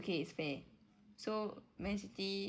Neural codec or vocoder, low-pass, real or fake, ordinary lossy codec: none; none; real; none